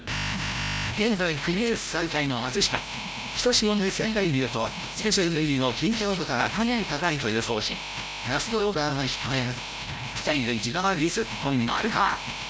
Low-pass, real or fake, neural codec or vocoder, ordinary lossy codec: none; fake; codec, 16 kHz, 0.5 kbps, FreqCodec, larger model; none